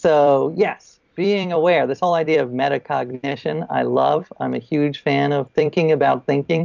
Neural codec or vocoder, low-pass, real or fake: vocoder, 44.1 kHz, 128 mel bands every 256 samples, BigVGAN v2; 7.2 kHz; fake